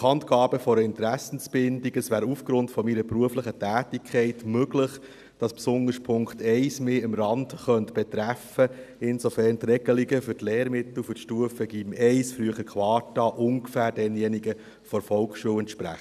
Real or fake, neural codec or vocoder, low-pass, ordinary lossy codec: real; none; 14.4 kHz; none